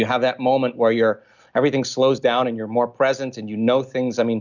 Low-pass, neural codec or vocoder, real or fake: 7.2 kHz; none; real